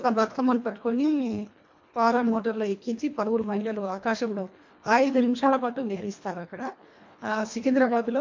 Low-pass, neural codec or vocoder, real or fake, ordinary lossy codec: 7.2 kHz; codec, 24 kHz, 1.5 kbps, HILCodec; fake; MP3, 48 kbps